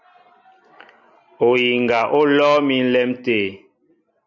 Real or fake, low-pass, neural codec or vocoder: real; 7.2 kHz; none